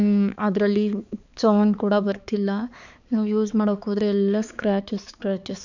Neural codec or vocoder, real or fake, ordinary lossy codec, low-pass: codec, 16 kHz, 4 kbps, X-Codec, HuBERT features, trained on balanced general audio; fake; none; 7.2 kHz